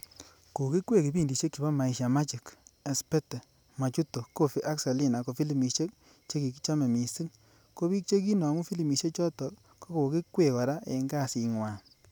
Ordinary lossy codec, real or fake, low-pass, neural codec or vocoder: none; real; none; none